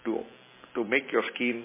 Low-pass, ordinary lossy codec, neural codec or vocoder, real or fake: 3.6 kHz; MP3, 16 kbps; none; real